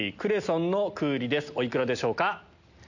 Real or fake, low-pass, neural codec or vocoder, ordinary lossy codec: real; 7.2 kHz; none; none